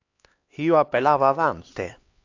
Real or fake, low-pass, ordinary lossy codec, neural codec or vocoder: fake; 7.2 kHz; AAC, 48 kbps; codec, 16 kHz, 2 kbps, X-Codec, HuBERT features, trained on LibriSpeech